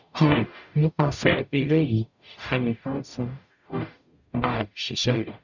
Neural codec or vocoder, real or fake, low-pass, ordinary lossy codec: codec, 44.1 kHz, 0.9 kbps, DAC; fake; 7.2 kHz; none